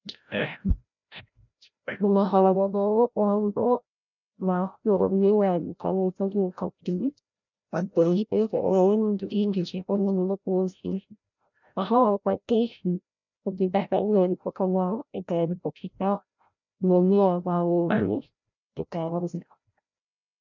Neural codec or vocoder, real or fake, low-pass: codec, 16 kHz, 0.5 kbps, FreqCodec, larger model; fake; 7.2 kHz